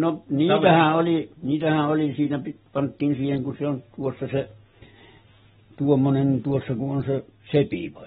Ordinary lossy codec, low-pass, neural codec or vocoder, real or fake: AAC, 16 kbps; 7.2 kHz; none; real